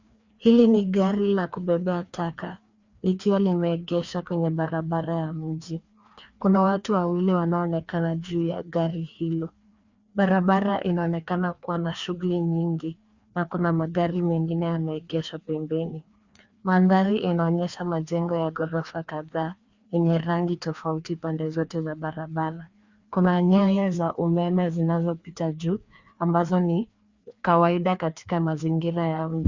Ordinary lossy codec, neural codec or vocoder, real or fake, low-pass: Opus, 64 kbps; codec, 16 kHz, 2 kbps, FreqCodec, larger model; fake; 7.2 kHz